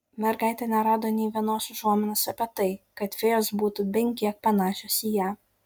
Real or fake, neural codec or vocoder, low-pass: real; none; 19.8 kHz